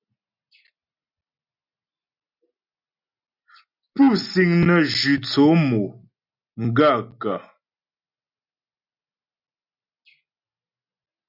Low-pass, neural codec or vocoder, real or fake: 5.4 kHz; none; real